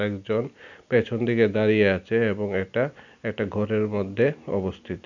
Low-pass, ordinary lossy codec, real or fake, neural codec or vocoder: 7.2 kHz; none; real; none